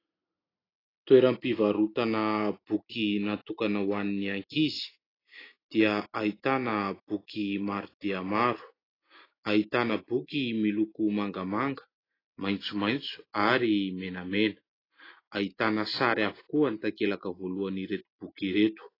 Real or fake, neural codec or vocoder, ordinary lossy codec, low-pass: real; none; AAC, 24 kbps; 5.4 kHz